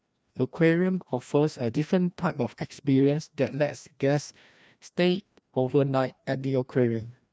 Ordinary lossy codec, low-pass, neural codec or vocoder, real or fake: none; none; codec, 16 kHz, 1 kbps, FreqCodec, larger model; fake